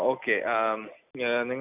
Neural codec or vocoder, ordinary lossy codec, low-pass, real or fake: none; none; 3.6 kHz; real